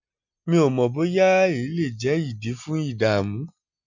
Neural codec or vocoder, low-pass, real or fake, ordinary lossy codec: none; 7.2 kHz; real; none